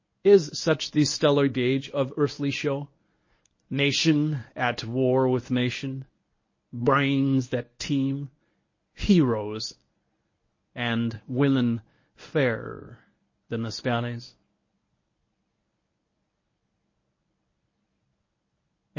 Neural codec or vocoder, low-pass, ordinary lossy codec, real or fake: codec, 24 kHz, 0.9 kbps, WavTokenizer, medium speech release version 1; 7.2 kHz; MP3, 32 kbps; fake